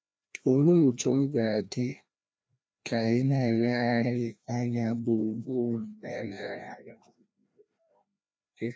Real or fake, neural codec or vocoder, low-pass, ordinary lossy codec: fake; codec, 16 kHz, 1 kbps, FreqCodec, larger model; none; none